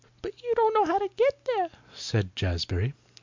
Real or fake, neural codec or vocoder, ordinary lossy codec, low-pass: real; none; MP3, 64 kbps; 7.2 kHz